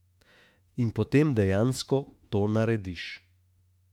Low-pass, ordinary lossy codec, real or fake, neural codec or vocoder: 19.8 kHz; MP3, 96 kbps; fake; autoencoder, 48 kHz, 32 numbers a frame, DAC-VAE, trained on Japanese speech